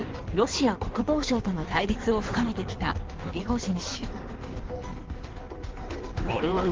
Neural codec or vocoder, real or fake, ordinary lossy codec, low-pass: codec, 16 kHz in and 24 kHz out, 1.1 kbps, FireRedTTS-2 codec; fake; Opus, 16 kbps; 7.2 kHz